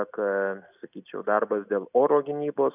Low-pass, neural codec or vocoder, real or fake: 3.6 kHz; none; real